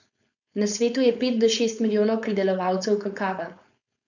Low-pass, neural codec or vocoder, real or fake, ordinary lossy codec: 7.2 kHz; codec, 16 kHz, 4.8 kbps, FACodec; fake; none